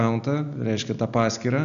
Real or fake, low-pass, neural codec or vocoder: real; 7.2 kHz; none